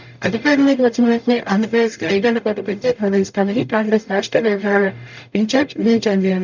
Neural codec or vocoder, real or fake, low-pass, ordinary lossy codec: codec, 44.1 kHz, 0.9 kbps, DAC; fake; 7.2 kHz; none